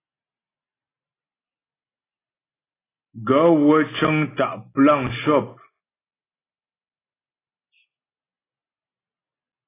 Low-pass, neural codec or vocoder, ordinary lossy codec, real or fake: 3.6 kHz; none; AAC, 16 kbps; real